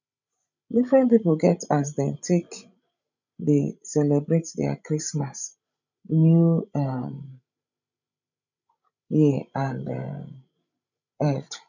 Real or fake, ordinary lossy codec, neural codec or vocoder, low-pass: fake; none; codec, 16 kHz, 16 kbps, FreqCodec, larger model; 7.2 kHz